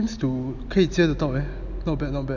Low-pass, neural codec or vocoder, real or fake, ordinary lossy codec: 7.2 kHz; none; real; none